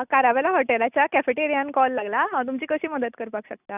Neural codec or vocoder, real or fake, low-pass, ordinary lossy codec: none; real; 3.6 kHz; none